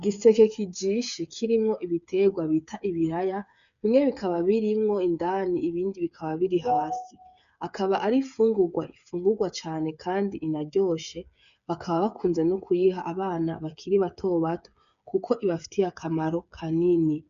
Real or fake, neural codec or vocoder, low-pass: fake; codec, 16 kHz, 8 kbps, FreqCodec, smaller model; 7.2 kHz